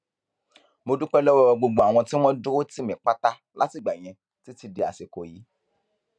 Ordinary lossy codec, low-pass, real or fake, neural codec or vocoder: none; 9.9 kHz; real; none